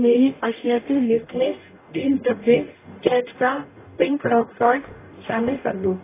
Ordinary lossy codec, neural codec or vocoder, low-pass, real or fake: AAC, 16 kbps; codec, 44.1 kHz, 0.9 kbps, DAC; 3.6 kHz; fake